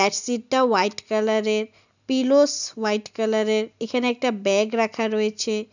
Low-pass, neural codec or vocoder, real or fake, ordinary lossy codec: 7.2 kHz; none; real; none